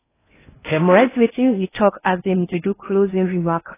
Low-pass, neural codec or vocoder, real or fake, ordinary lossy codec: 3.6 kHz; codec, 16 kHz in and 24 kHz out, 0.6 kbps, FocalCodec, streaming, 4096 codes; fake; AAC, 16 kbps